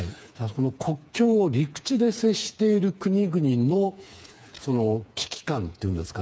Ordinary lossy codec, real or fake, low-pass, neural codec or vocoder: none; fake; none; codec, 16 kHz, 4 kbps, FreqCodec, smaller model